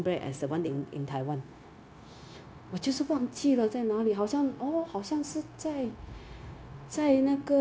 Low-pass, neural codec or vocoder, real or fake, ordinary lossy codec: none; codec, 16 kHz, 0.9 kbps, LongCat-Audio-Codec; fake; none